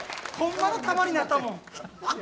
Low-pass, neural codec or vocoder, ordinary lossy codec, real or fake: none; none; none; real